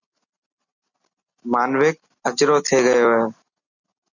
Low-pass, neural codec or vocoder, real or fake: 7.2 kHz; none; real